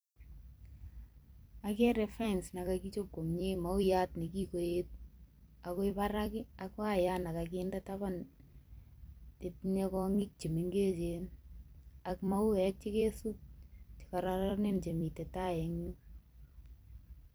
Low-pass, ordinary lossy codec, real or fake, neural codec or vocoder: none; none; fake; vocoder, 44.1 kHz, 128 mel bands every 256 samples, BigVGAN v2